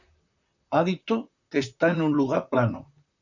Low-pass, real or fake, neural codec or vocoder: 7.2 kHz; fake; vocoder, 44.1 kHz, 128 mel bands, Pupu-Vocoder